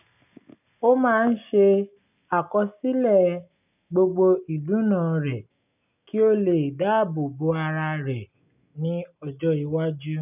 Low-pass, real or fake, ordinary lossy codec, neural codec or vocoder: 3.6 kHz; real; none; none